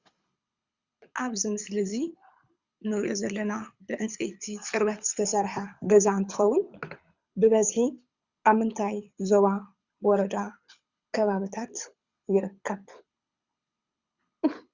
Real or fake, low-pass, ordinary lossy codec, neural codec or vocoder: fake; 7.2 kHz; Opus, 64 kbps; codec, 24 kHz, 6 kbps, HILCodec